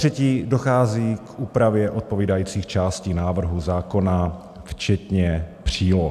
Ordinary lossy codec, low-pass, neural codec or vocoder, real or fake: AAC, 96 kbps; 14.4 kHz; none; real